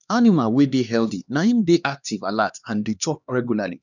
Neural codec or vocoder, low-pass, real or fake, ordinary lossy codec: codec, 16 kHz, 2 kbps, X-Codec, HuBERT features, trained on LibriSpeech; 7.2 kHz; fake; none